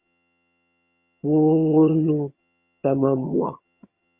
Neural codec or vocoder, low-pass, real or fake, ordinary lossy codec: vocoder, 22.05 kHz, 80 mel bands, HiFi-GAN; 3.6 kHz; fake; Opus, 64 kbps